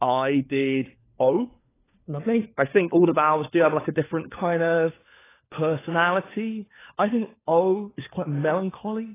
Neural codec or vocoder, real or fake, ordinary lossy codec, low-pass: codec, 16 kHz, 4 kbps, FunCodec, trained on LibriTTS, 50 frames a second; fake; AAC, 16 kbps; 3.6 kHz